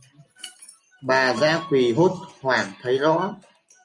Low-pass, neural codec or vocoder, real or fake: 10.8 kHz; none; real